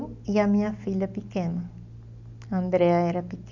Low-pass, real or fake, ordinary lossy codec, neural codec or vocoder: 7.2 kHz; real; none; none